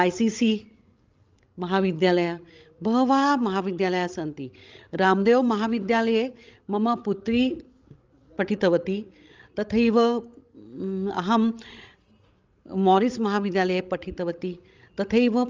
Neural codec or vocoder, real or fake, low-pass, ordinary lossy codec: codec, 16 kHz, 16 kbps, FreqCodec, larger model; fake; 7.2 kHz; Opus, 32 kbps